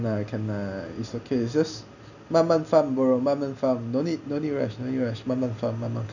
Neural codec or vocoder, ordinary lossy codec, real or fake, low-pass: none; none; real; 7.2 kHz